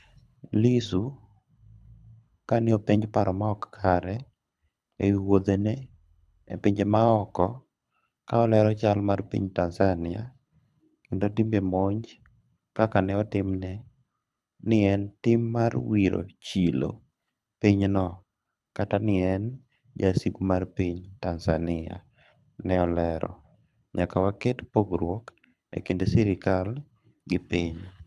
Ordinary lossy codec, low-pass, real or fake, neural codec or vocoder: none; none; fake; codec, 24 kHz, 6 kbps, HILCodec